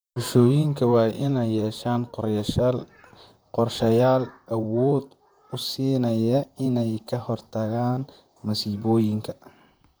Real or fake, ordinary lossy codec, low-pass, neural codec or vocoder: fake; none; none; vocoder, 44.1 kHz, 128 mel bands, Pupu-Vocoder